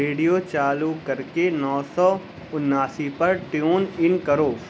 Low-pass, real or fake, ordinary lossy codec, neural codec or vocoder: none; real; none; none